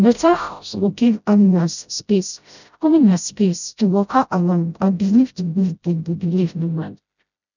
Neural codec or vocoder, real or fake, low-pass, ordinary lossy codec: codec, 16 kHz, 0.5 kbps, FreqCodec, smaller model; fake; 7.2 kHz; none